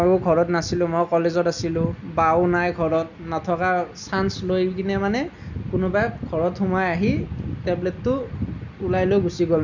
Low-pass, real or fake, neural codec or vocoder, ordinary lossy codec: 7.2 kHz; real; none; none